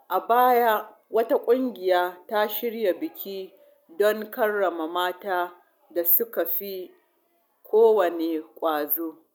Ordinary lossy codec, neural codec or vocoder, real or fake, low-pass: none; none; real; 19.8 kHz